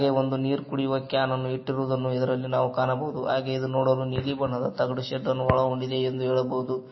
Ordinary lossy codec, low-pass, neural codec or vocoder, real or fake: MP3, 24 kbps; 7.2 kHz; none; real